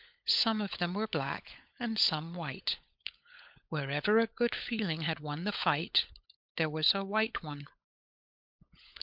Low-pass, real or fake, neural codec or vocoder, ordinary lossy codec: 5.4 kHz; fake; codec, 16 kHz, 16 kbps, FunCodec, trained on LibriTTS, 50 frames a second; MP3, 48 kbps